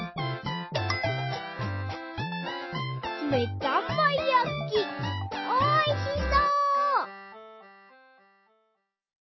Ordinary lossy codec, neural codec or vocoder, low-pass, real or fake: MP3, 24 kbps; none; 7.2 kHz; real